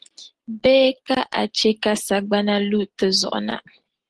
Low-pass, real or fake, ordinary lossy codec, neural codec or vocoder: 10.8 kHz; real; Opus, 16 kbps; none